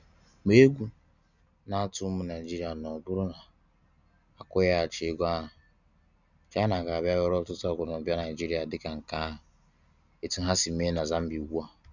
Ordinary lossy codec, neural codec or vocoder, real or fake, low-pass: none; none; real; 7.2 kHz